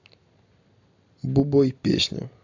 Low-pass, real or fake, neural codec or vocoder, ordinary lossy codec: 7.2 kHz; real; none; AAC, 48 kbps